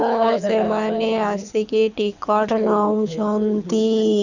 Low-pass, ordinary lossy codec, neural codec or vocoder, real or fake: 7.2 kHz; none; codec, 24 kHz, 6 kbps, HILCodec; fake